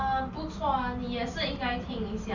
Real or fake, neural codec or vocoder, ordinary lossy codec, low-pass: real; none; none; 7.2 kHz